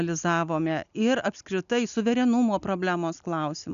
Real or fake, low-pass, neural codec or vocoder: real; 7.2 kHz; none